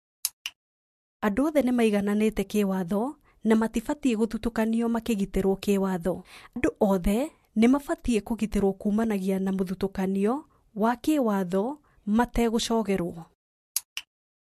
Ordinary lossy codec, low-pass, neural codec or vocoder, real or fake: MP3, 64 kbps; 14.4 kHz; none; real